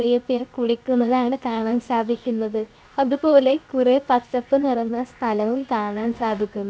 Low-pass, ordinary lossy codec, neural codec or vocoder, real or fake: none; none; codec, 16 kHz, 0.7 kbps, FocalCodec; fake